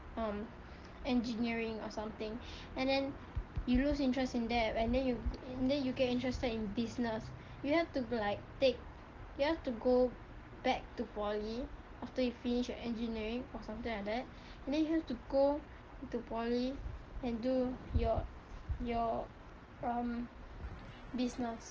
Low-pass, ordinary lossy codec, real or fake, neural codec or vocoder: 7.2 kHz; Opus, 16 kbps; real; none